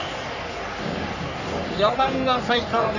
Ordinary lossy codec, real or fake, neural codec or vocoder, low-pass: none; fake; codec, 44.1 kHz, 3.4 kbps, Pupu-Codec; 7.2 kHz